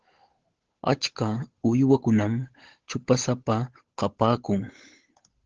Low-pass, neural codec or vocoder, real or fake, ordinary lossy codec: 7.2 kHz; codec, 16 kHz, 8 kbps, FunCodec, trained on Chinese and English, 25 frames a second; fake; Opus, 16 kbps